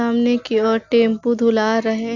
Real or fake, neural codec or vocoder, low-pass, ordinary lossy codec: real; none; 7.2 kHz; none